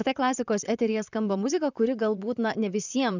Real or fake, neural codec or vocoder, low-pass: fake; vocoder, 44.1 kHz, 128 mel bands, Pupu-Vocoder; 7.2 kHz